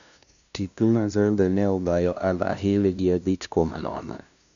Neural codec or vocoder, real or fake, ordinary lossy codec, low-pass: codec, 16 kHz, 0.5 kbps, FunCodec, trained on LibriTTS, 25 frames a second; fake; none; 7.2 kHz